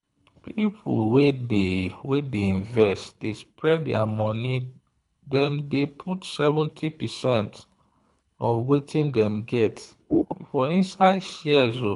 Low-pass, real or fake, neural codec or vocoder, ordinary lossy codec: 10.8 kHz; fake; codec, 24 kHz, 3 kbps, HILCodec; none